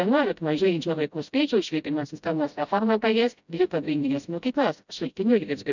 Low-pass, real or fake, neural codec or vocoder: 7.2 kHz; fake; codec, 16 kHz, 0.5 kbps, FreqCodec, smaller model